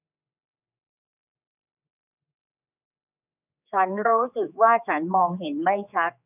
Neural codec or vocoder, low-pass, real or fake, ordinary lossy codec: codec, 16 kHz, 4 kbps, X-Codec, HuBERT features, trained on general audio; 3.6 kHz; fake; none